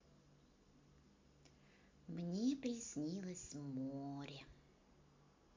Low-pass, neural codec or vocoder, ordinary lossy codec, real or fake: 7.2 kHz; none; MP3, 48 kbps; real